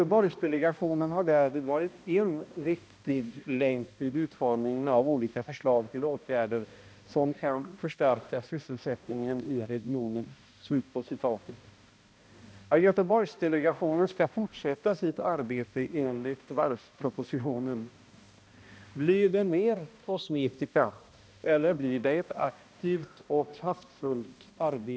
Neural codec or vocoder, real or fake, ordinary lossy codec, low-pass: codec, 16 kHz, 1 kbps, X-Codec, HuBERT features, trained on balanced general audio; fake; none; none